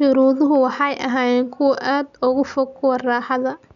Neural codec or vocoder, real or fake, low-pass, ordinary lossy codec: none; real; 7.2 kHz; none